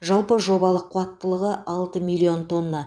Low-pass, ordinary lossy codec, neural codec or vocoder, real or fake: 9.9 kHz; none; vocoder, 22.05 kHz, 80 mel bands, WaveNeXt; fake